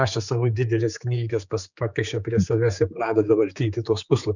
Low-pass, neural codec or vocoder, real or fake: 7.2 kHz; codec, 16 kHz, 4 kbps, X-Codec, HuBERT features, trained on general audio; fake